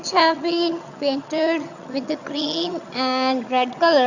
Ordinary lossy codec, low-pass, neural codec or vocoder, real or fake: Opus, 64 kbps; 7.2 kHz; vocoder, 22.05 kHz, 80 mel bands, HiFi-GAN; fake